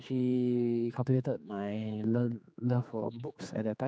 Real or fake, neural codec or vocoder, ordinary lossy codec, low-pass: fake; codec, 16 kHz, 4 kbps, X-Codec, HuBERT features, trained on general audio; none; none